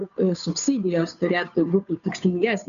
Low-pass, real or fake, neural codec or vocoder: 7.2 kHz; fake; codec, 16 kHz, 2 kbps, FunCodec, trained on Chinese and English, 25 frames a second